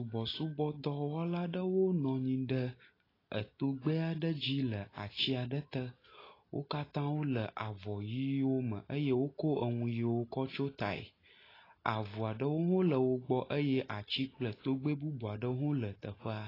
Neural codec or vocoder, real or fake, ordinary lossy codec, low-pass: none; real; AAC, 24 kbps; 5.4 kHz